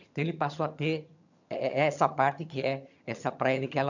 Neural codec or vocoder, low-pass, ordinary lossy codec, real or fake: vocoder, 22.05 kHz, 80 mel bands, HiFi-GAN; 7.2 kHz; none; fake